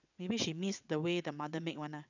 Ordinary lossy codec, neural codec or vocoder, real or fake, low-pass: none; vocoder, 22.05 kHz, 80 mel bands, WaveNeXt; fake; 7.2 kHz